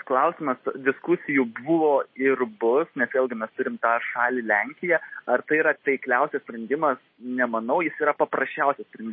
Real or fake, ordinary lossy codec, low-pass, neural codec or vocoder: real; MP3, 24 kbps; 7.2 kHz; none